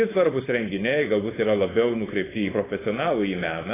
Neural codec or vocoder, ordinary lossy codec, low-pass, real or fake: codec, 16 kHz, 4.8 kbps, FACodec; AAC, 16 kbps; 3.6 kHz; fake